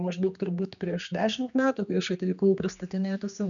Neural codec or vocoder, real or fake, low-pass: codec, 16 kHz, 2 kbps, X-Codec, HuBERT features, trained on general audio; fake; 7.2 kHz